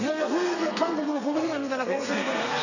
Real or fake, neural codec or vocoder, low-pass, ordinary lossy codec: fake; codec, 32 kHz, 1.9 kbps, SNAC; 7.2 kHz; none